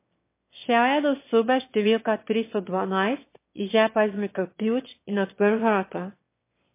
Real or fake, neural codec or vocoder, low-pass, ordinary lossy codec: fake; autoencoder, 22.05 kHz, a latent of 192 numbers a frame, VITS, trained on one speaker; 3.6 kHz; MP3, 24 kbps